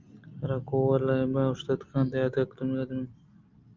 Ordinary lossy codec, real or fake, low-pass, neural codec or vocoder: Opus, 24 kbps; real; 7.2 kHz; none